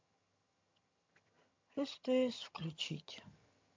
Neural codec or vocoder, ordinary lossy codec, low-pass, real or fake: vocoder, 22.05 kHz, 80 mel bands, HiFi-GAN; MP3, 48 kbps; 7.2 kHz; fake